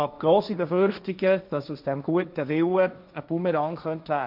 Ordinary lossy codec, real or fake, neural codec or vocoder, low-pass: none; fake; codec, 16 kHz, 1.1 kbps, Voila-Tokenizer; 5.4 kHz